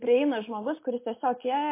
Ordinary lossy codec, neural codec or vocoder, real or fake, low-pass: MP3, 24 kbps; none; real; 3.6 kHz